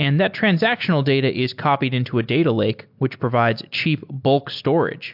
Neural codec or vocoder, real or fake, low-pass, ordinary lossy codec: none; real; 5.4 kHz; MP3, 48 kbps